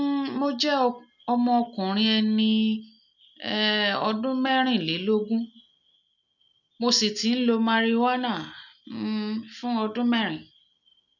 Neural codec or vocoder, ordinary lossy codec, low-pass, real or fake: none; none; 7.2 kHz; real